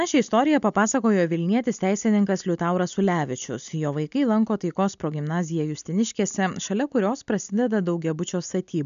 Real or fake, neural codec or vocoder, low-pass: real; none; 7.2 kHz